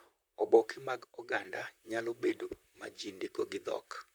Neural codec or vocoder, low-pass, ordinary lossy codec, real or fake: vocoder, 44.1 kHz, 128 mel bands, Pupu-Vocoder; none; none; fake